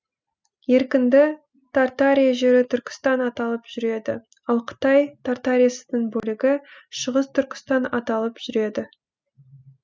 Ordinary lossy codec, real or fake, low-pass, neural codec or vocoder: none; real; none; none